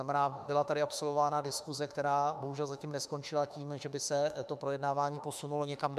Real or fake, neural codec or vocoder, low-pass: fake; autoencoder, 48 kHz, 32 numbers a frame, DAC-VAE, trained on Japanese speech; 14.4 kHz